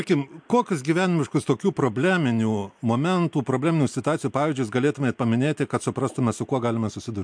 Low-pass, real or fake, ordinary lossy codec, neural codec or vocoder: 9.9 kHz; real; MP3, 64 kbps; none